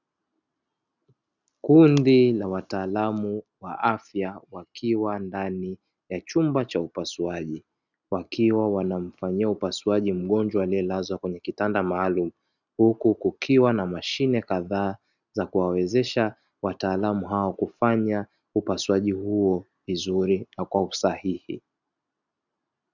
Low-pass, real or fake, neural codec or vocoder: 7.2 kHz; real; none